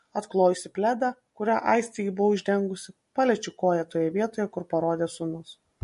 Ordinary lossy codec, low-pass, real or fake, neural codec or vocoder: MP3, 48 kbps; 14.4 kHz; real; none